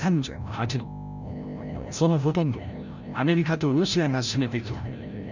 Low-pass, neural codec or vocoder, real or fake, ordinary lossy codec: 7.2 kHz; codec, 16 kHz, 0.5 kbps, FreqCodec, larger model; fake; none